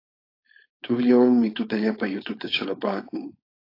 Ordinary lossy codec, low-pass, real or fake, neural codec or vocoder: AAC, 32 kbps; 5.4 kHz; fake; codec, 16 kHz, 4.8 kbps, FACodec